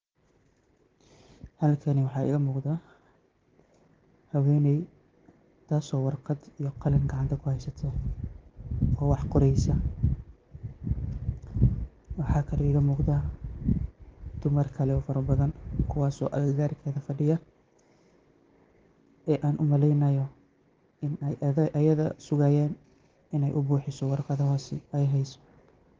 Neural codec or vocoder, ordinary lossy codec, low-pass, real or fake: none; Opus, 16 kbps; 7.2 kHz; real